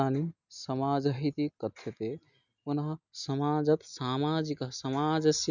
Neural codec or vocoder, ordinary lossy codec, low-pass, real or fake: none; none; 7.2 kHz; real